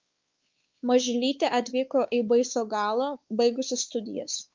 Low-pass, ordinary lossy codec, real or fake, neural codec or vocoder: 7.2 kHz; Opus, 24 kbps; fake; codec, 16 kHz, 4 kbps, X-Codec, WavLM features, trained on Multilingual LibriSpeech